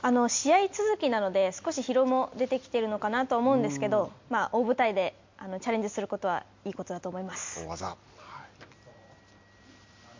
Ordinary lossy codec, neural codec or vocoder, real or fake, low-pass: MP3, 48 kbps; none; real; 7.2 kHz